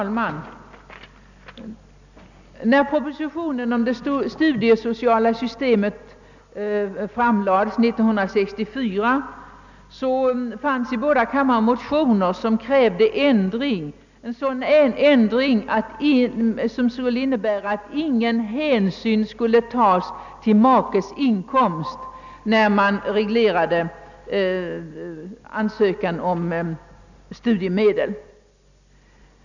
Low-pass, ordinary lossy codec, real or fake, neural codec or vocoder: 7.2 kHz; none; real; none